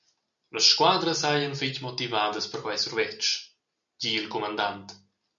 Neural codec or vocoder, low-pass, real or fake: none; 7.2 kHz; real